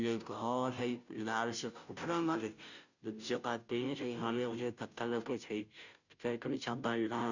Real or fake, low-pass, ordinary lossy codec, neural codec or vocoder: fake; 7.2 kHz; none; codec, 16 kHz, 0.5 kbps, FunCodec, trained on Chinese and English, 25 frames a second